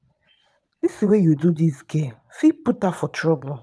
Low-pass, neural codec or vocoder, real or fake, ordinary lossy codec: none; vocoder, 22.05 kHz, 80 mel bands, Vocos; fake; none